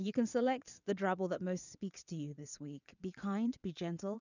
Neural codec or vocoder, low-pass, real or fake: vocoder, 22.05 kHz, 80 mel bands, WaveNeXt; 7.2 kHz; fake